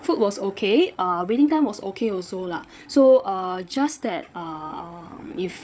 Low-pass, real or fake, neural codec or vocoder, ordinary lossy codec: none; fake; codec, 16 kHz, 8 kbps, FreqCodec, larger model; none